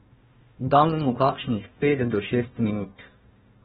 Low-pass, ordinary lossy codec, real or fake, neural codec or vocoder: 7.2 kHz; AAC, 16 kbps; fake; codec, 16 kHz, 1 kbps, FunCodec, trained on Chinese and English, 50 frames a second